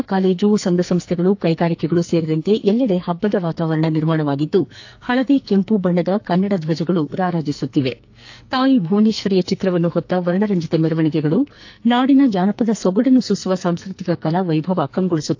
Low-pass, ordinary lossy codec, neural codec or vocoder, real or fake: 7.2 kHz; AAC, 48 kbps; codec, 44.1 kHz, 2.6 kbps, SNAC; fake